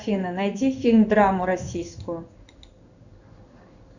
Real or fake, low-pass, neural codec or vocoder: fake; 7.2 kHz; codec, 16 kHz in and 24 kHz out, 1 kbps, XY-Tokenizer